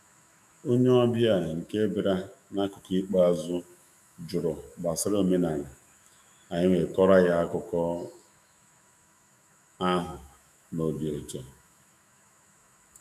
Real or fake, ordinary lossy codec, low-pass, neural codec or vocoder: fake; none; 14.4 kHz; autoencoder, 48 kHz, 128 numbers a frame, DAC-VAE, trained on Japanese speech